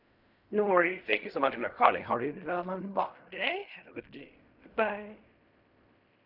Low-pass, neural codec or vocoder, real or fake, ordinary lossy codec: 5.4 kHz; codec, 16 kHz in and 24 kHz out, 0.4 kbps, LongCat-Audio-Codec, fine tuned four codebook decoder; fake; none